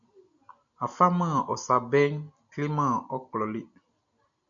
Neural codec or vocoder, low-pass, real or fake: none; 7.2 kHz; real